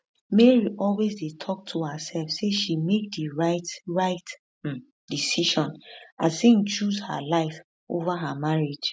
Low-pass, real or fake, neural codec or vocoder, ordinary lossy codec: none; real; none; none